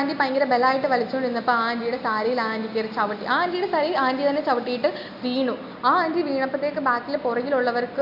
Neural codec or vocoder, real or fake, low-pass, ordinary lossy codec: none; real; 5.4 kHz; none